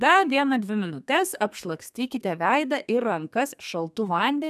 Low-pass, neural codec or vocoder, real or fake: 14.4 kHz; codec, 32 kHz, 1.9 kbps, SNAC; fake